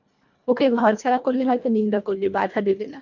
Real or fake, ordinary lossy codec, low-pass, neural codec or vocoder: fake; MP3, 64 kbps; 7.2 kHz; codec, 24 kHz, 1.5 kbps, HILCodec